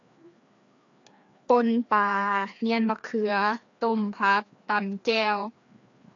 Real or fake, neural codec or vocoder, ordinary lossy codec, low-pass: fake; codec, 16 kHz, 2 kbps, FreqCodec, larger model; AAC, 48 kbps; 7.2 kHz